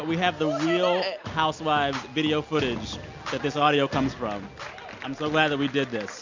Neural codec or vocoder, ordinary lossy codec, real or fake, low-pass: none; MP3, 64 kbps; real; 7.2 kHz